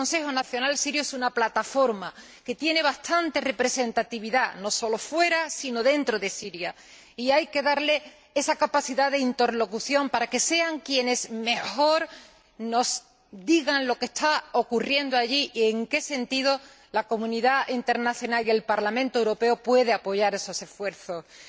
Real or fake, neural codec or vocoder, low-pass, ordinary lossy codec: real; none; none; none